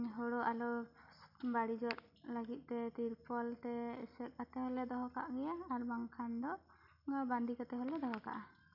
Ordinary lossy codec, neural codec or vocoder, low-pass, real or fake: none; none; 5.4 kHz; real